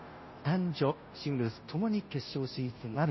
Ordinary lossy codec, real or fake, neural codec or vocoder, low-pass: MP3, 24 kbps; fake; codec, 16 kHz, 0.5 kbps, FunCodec, trained on Chinese and English, 25 frames a second; 7.2 kHz